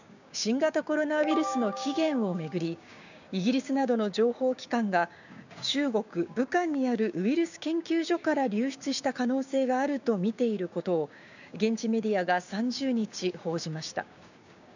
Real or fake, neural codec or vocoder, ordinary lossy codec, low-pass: fake; codec, 16 kHz, 6 kbps, DAC; none; 7.2 kHz